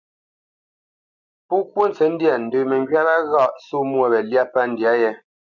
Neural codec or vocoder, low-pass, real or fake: none; 7.2 kHz; real